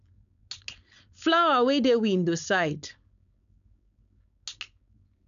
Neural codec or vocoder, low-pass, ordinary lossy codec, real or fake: codec, 16 kHz, 4.8 kbps, FACodec; 7.2 kHz; none; fake